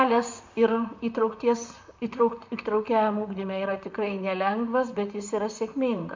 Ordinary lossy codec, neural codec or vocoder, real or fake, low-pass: MP3, 64 kbps; vocoder, 44.1 kHz, 128 mel bands, Pupu-Vocoder; fake; 7.2 kHz